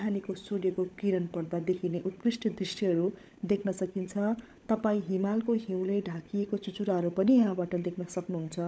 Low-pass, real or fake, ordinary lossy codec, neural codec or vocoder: none; fake; none; codec, 16 kHz, 16 kbps, FunCodec, trained on LibriTTS, 50 frames a second